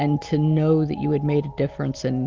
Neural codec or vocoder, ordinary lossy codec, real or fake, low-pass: none; Opus, 32 kbps; real; 7.2 kHz